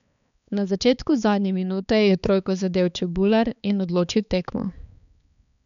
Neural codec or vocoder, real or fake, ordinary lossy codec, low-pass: codec, 16 kHz, 4 kbps, X-Codec, HuBERT features, trained on balanced general audio; fake; none; 7.2 kHz